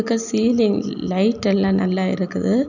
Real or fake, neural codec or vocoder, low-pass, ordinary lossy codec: real; none; 7.2 kHz; none